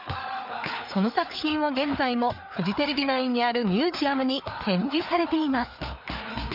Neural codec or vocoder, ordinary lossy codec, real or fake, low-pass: codec, 16 kHz, 4 kbps, FreqCodec, larger model; none; fake; 5.4 kHz